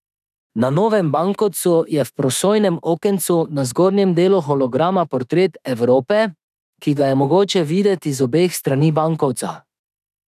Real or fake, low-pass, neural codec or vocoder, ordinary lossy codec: fake; 14.4 kHz; autoencoder, 48 kHz, 32 numbers a frame, DAC-VAE, trained on Japanese speech; none